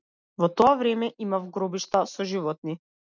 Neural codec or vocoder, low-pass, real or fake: none; 7.2 kHz; real